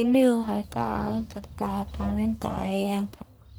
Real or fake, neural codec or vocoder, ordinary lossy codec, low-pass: fake; codec, 44.1 kHz, 1.7 kbps, Pupu-Codec; none; none